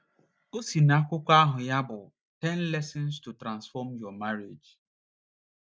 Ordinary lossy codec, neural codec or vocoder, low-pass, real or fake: none; none; none; real